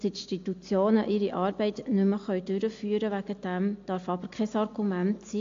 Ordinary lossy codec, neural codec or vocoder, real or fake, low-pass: AAC, 48 kbps; none; real; 7.2 kHz